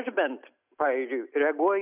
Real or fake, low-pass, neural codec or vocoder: real; 3.6 kHz; none